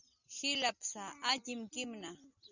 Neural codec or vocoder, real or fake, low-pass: none; real; 7.2 kHz